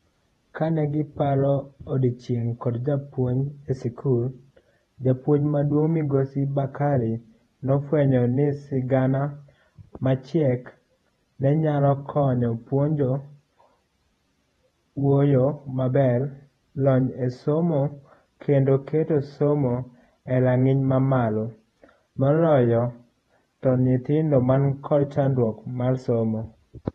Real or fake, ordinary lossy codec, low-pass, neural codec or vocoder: fake; AAC, 32 kbps; 19.8 kHz; vocoder, 48 kHz, 128 mel bands, Vocos